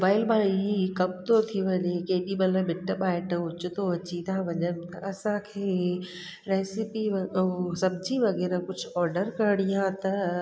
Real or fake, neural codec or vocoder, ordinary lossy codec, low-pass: real; none; none; none